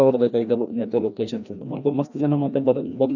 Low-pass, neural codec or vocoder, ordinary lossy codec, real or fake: 7.2 kHz; codec, 16 kHz, 1 kbps, FreqCodec, larger model; none; fake